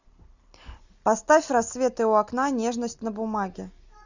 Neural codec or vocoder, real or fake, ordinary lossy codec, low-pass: none; real; Opus, 64 kbps; 7.2 kHz